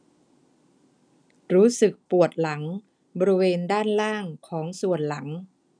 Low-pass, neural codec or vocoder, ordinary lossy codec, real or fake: 9.9 kHz; none; none; real